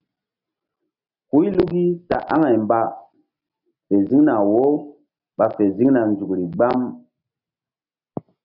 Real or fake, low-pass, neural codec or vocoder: real; 5.4 kHz; none